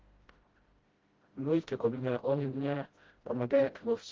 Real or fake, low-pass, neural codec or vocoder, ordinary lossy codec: fake; 7.2 kHz; codec, 16 kHz, 0.5 kbps, FreqCodec, smaller model; Opus, 16 kbps